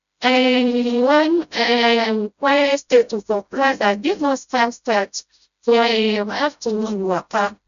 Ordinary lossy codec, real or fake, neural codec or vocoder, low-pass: none; fake; codec, 16 kHz, 0.5 kbps, FreqCodec, smaller model; 7.2 kHz